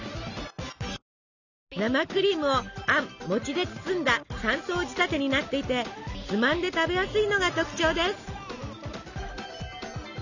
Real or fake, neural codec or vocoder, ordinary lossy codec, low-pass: real; none; none; 7.2 kHz